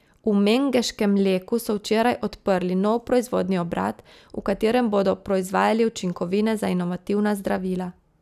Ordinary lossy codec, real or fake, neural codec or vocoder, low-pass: none; real; none; 14.4 kHz